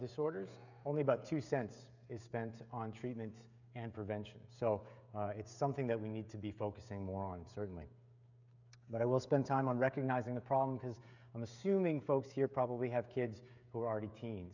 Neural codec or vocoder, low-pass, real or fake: codec, 16 kHz, 16 kbps, FreqCodec, smaller model; 7.2 kHz; fake